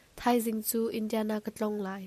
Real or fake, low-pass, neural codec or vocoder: real; 14.4 kHz; none